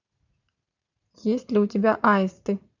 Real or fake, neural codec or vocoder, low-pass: fake; vocoder, 44.1 kHz, 80 mel bands, Vocos; 7.2 kHz